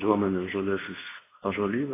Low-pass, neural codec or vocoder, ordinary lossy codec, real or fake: 3.6 kHz; codec, 24 kHz, 0.9 kbps, WavTokenizer, medium speech release version 2; AAC, 16 kbps; fake